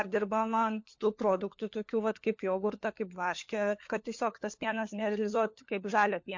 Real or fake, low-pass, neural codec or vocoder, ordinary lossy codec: fake; 7.2 kHz; codec, 16 kHz, 4 kbps, FunCodec, trained on LibriTTS, 50 frames a second; MP3, 48 kbps